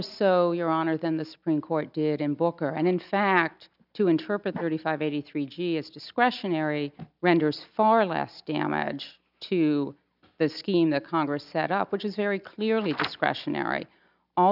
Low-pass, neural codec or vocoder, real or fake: 5.4 kHz; none; real